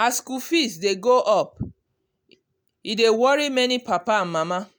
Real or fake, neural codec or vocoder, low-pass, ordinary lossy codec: real; none; none; none